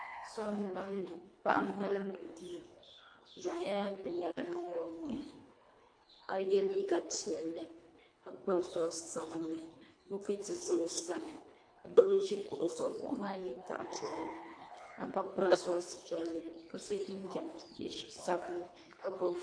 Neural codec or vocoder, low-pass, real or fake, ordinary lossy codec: codec, 24 kHz, 1.5 kbps, HILCodec; 9.9 kHz; fake; AAC, 48 kbps